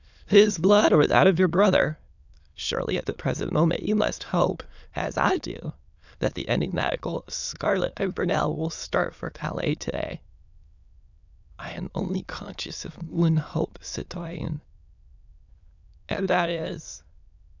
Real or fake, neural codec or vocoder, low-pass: fake; autoencoder, 22.05 kHz, a latent of 192 numbers a frame, VITS, trained on many speakers; 7.2 kHz